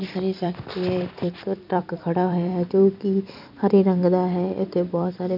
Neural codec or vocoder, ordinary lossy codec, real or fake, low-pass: vocoder, 44.1 kHz, 128 mel bands every 256 samples, BigVGAN v2; none; fake; 5.4 kHz